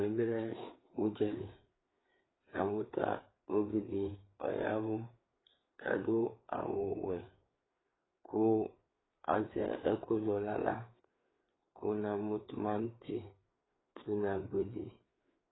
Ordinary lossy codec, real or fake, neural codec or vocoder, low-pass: AAC, 16 kbps; fake; codec, 16 kHz, 4 kbps, FreqCodec, larger model; 7.2 kHz